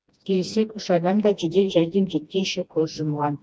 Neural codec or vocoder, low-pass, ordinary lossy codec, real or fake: codec, 16 kHz, 1 kbps, FreqCodec, smaller model; none; none; fake